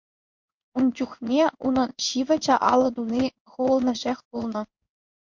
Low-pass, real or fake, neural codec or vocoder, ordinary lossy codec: 7.2 kHz; fake; codec, 16 kHz in and 24 kHz out, 1 kbps, XY-Tokenizer; MP3, 48 kbps